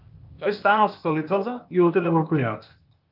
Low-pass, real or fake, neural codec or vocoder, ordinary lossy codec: 5.4 kHz; fake; codec, 16 kHz, 0.8 kbps, ZipCodec; Opus, 24 kbps